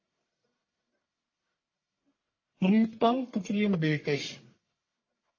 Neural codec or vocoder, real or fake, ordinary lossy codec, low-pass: codec, 44.1 kHz, 1.7 kbps, Pupu-Codec; fake; MP3, 32 kbps; 7.2 kHz